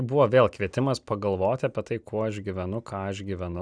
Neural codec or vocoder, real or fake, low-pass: none; real; 9.9 kHz